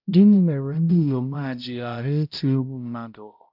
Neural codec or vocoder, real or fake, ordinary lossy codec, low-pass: codec, 16 kHz, 0.5 kbps, X-Codec, HuBERT features, trained on balanced general audio; fake; none; 5.4 kHz